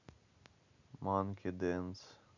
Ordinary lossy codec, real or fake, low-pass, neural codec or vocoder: none; real; 7.2 kHz; none